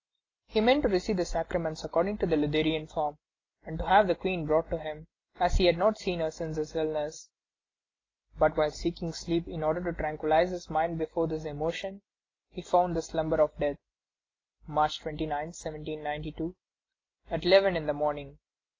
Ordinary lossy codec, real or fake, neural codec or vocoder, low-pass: AAC, 32 kbps; real; none; 7.2 kHz